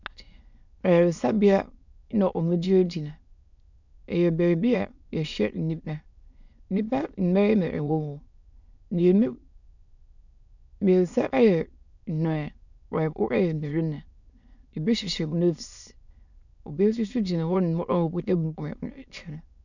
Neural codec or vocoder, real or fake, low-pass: autoencoder, 22.05 kHz, a latent of 192 numbers a frame, VITS, trained on many speakers; fake; 7.2 kHz